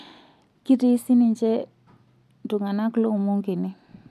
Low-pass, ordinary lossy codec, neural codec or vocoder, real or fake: 14.4 kHz; MP3, 96 kbps; none; real